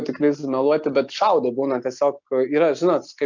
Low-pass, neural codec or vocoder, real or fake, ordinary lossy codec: 7.2 kHz; none; real; MP3, 64 kbps